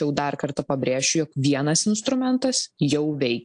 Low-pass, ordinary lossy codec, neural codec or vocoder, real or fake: 9.9 kHz; AAC, 64 kbps; none; real